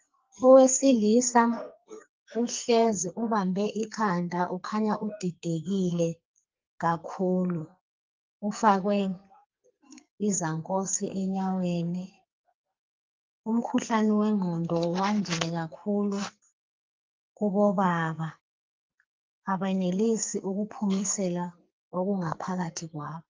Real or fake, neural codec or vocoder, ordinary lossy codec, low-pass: fake; codec, 44.1 kHz, 2.6 kbps, SNAC; Opus, 32 kbps; 7.2 kHz